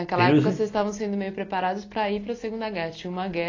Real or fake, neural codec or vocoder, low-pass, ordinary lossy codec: real; none; 7.2 kHz; AAC, 32 kbps